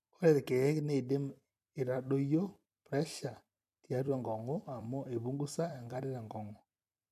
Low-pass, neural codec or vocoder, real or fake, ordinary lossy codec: 14.4 kHz; vocoder, 44.1 kHz, 128 mel bands every 256 samples, BigVGAN v2; fake; none